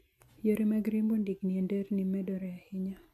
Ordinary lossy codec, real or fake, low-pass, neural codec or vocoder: MP3, 64 kbps; real; 14.4 kHz; none